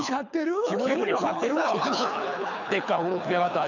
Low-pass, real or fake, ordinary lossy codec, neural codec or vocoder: 7.2 kHz; fake; none; codec, 24 kHz, 6 kbps, HILCodec